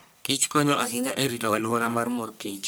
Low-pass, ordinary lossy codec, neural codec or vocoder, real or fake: none; none; codec, 44.1 kHz, 1.7 kbps, Pupu-Codec; fake